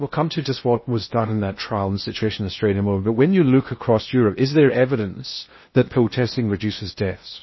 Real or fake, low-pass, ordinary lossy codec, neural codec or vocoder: fake; 7.2 kHz; MP3, 24 kbps; codec, 16 kHz in and 24 kHz out, 0.6 kbps, FocalCodec, streaming, 2048 codes